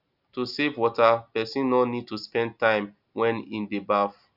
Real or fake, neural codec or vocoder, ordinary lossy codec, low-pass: real; none; none; 5.4 kHz